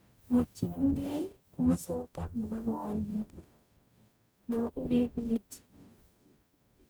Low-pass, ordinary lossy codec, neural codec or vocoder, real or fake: none; none; codec, 44.1 kHz, 0.9 kbps, DAC; fake